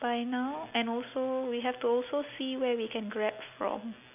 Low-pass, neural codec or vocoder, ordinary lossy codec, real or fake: 3.6 kHz; none; none; real